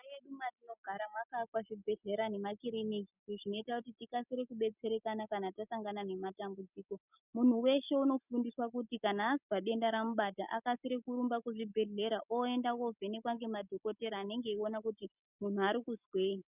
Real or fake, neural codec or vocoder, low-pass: real; none; 3.6 kHz